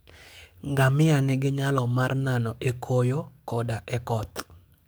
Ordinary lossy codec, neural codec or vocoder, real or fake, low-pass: none; codec, 44.1 kHz, 7.8 kbps, DAC; fake; none